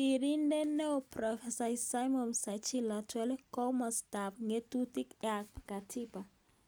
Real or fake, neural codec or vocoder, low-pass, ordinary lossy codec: real; none; none; none